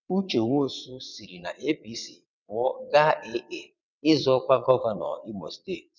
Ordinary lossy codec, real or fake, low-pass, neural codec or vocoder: none; fake; 7.2 kHz; vocoder, 22.05 kHz, 80 mel bands, WaveNeXt